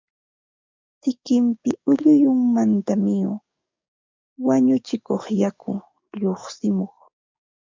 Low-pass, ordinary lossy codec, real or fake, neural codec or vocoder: 7.2 kHz; MP3, 64 kbps; fake; codec, 44.1 kHz, 7.8 kbps, DAC